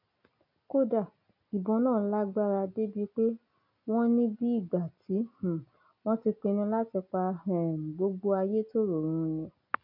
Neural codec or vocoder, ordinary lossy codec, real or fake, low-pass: none; none; real; 5.4 kHz